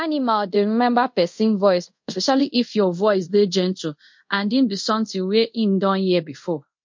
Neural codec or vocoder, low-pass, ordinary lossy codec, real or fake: codec, 24 kHz, 0.5 kbps, DualCodec; 7.2 kHz; MP3, 48 kbps; fake